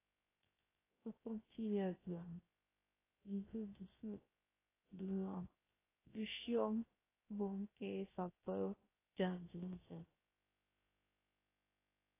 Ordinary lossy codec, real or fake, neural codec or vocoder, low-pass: AAC, 16 kbps; fake; codec, 16 kHz, 0.3 kbps, FocalCodec; 3.6 kHz